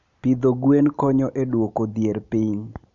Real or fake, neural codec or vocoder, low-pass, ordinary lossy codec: real; none; 7.2 kHz; none